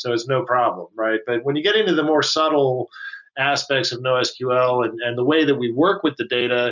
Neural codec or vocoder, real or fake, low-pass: none; real; 7.2 kHz